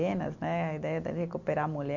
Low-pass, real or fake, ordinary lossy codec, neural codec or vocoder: 7.2 kHz; real; MP3, 48 kbps; none